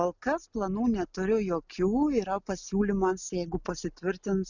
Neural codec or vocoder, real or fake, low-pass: none; real; 7.2 kHz